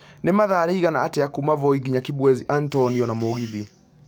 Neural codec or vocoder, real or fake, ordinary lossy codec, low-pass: codec, 44.1 kHz, 7.8 kbps, DAC; fake; none; none